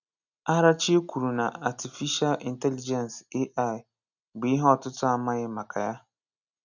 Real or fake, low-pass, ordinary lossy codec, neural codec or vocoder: real; 7.2 kHz; none; none